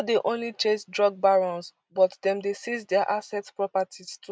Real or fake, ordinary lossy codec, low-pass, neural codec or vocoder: real; none; none; none